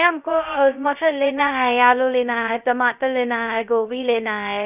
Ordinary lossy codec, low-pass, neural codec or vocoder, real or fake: none; 3.6 kHz; codec, 16 kHz, 0.2 kbps, FocalCodec; fake